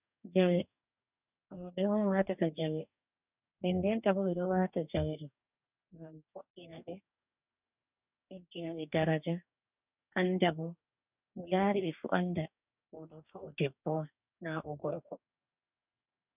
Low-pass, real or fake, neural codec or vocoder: 3.6 kHz; fake; codec, 44.1 kHz, 2.6 kbps, DAC